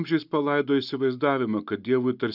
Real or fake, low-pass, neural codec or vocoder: real; 5.4 kHz; none